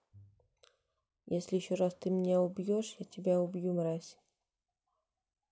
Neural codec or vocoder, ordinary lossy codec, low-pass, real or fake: none; none; none; real